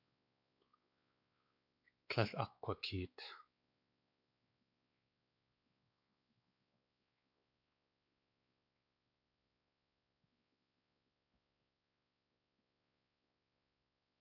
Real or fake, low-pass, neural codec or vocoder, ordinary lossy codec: fake; 5.4 kHz; codec, 16 kHz, 4 kbps, X-Codec, WavLM features, trained on Multilingual LibriSpeech; AAC, 48 kbps